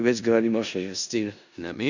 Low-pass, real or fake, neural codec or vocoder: 7.2 kHz; fake; codec, 16 kHz in and 24 kHz out, 0.4 kbps, LongCat-Audio-Codec, four codebook decoder